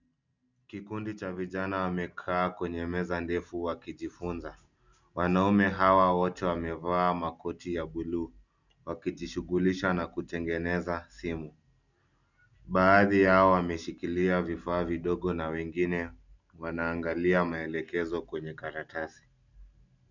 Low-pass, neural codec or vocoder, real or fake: 7.2 kHz; none; real